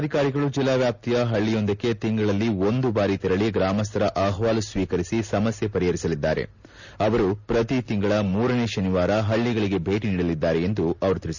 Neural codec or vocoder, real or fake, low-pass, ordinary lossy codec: none; real; 7.2 kHz; none